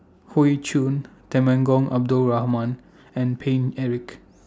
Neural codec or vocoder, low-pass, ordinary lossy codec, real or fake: none; none; none; real